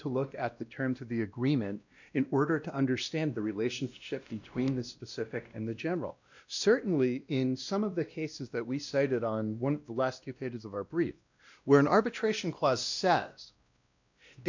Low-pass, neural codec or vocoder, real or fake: 7.2 kHz; codec, 16 kHz, 1 kbps, X-Codec, WavLM features, trained on Multilingual LibriSpeech; fake